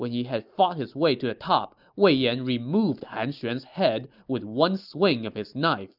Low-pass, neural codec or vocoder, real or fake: 5.4 kHz; none; real